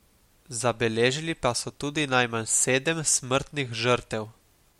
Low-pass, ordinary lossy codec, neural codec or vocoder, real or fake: 19.8 kHz; MP3, 64 kbps; none; real